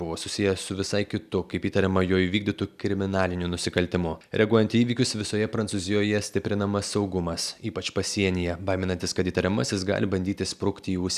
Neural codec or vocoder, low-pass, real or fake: none; 14.4 kHz; real